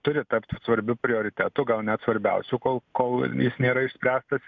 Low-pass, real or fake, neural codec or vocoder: 7.2 kHz; real; none